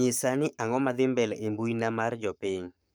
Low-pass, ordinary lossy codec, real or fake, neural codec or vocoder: none; none; fake; codec, 44.1 kHz, 7.8 kbps, Pupu-Codec